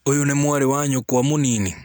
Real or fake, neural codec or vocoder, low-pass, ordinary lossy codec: real; none; none; none